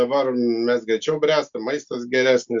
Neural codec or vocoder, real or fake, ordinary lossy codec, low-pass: none; real; Opus, 64 kbps; 7.2 kHz